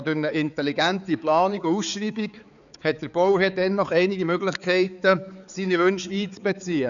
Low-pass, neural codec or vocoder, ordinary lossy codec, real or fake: 7.2 kHz; codec, 16 kHz, 4 kbps, X-Codec, HuBERT features, trained on balanced general audio; none; fake